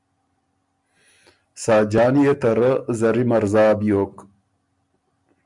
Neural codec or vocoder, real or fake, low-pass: none; real; 10.8 kHz